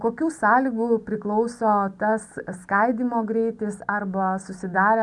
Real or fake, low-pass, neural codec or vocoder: real; 10.8 kHz; none